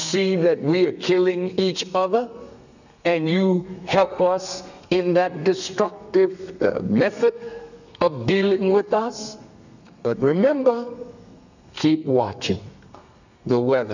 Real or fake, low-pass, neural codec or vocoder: fake; 7.2 kHz; codec, 32 kHz, 1.9 kbps, SNAC